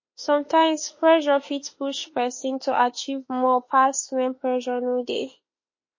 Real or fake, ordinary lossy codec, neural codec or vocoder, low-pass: fake; MP3, 32 kbps; autoencoder, 48 kHz, 32 numbers a frame, DAC-VAE, trained on Japanese speech; 7.2 kHz